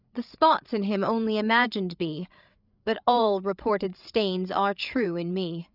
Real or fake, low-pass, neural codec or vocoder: fake; 5.4 kHz; codec, 16 kHz, 8 kbps, FreqCodec, larger model